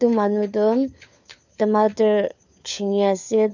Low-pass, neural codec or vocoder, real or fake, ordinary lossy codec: 7.2 kHz; codec, 16 kHz, 4 kbps, FunCodec, trained on LibriTTS, 50 frames a second; fake; none